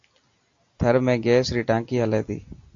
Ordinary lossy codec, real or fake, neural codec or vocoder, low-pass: AAC, 48 kbps; real; none; 7.2 kHz